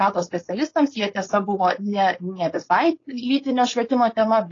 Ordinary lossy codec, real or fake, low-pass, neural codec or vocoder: AAC, 32 kbps; fake; 7.2 kHz; codec, 16 kHz, 4.8 kbps, FACodec